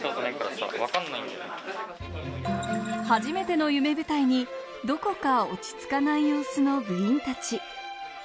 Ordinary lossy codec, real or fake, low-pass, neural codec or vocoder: none; real; none; none